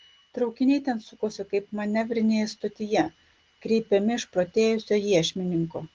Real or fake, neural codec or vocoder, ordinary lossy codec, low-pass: real; none; Opus, 16 kbps; 7.2 kHz